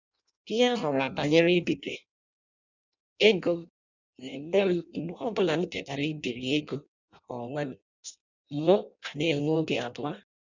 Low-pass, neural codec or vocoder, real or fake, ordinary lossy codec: 7.2 kHz; codec, 16 kHz in and 24 kHz out, 0.6 kbps, FireRedTTS-2 codec; fake; none